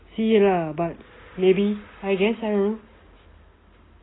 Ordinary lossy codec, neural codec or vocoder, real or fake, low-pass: AAC, 16 kbps; none; real; 7.2 kHz